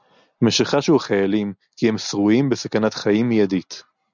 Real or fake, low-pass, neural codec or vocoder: real; 7.2 kHz; none